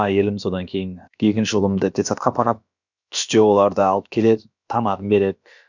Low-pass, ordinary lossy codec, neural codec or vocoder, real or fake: 7.2 kHz; none; codec, 16 kHz, about 1 kbps, DyCAST, with the encoder's durations; fake